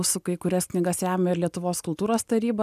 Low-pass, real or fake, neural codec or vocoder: 14.4 kHz; real; none